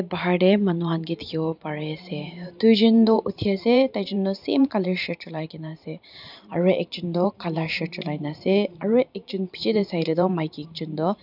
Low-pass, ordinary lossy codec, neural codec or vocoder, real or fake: 5.4 kHz; none; vocoder, 44.1 kHz, 128 mel bands every 512 samples, BigVGAN v2; fake